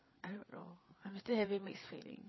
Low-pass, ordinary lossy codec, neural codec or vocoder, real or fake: 7.2 kHz; MP3, 24 kbps; codec, 16 kHz, 8 kbps, FreqCodec, larger model; fake